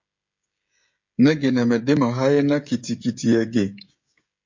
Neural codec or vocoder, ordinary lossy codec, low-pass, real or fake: codec, 16 kHz, 16 kbps, FreqCodec, smaller model; MP3, 48 kbps; 7.2 kHz; fake